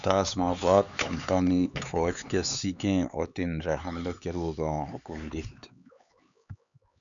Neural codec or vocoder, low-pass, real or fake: codec, 16 kHz, 4 kbps, X-Codec, HuBERT features, trained on LibriSpeech; 7.2 kHz; fake